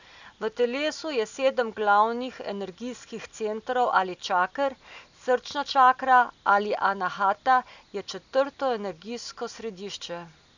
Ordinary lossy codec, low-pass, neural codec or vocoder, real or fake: none; 7.2 kHz; none; real